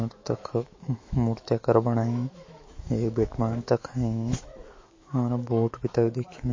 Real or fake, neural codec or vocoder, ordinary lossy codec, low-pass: fake; autoencoder, 48 kHz, 128 numbers a frame, DAC-VAE, trained on Japanese speech; MP3, 32 kbps; 7.2 kHz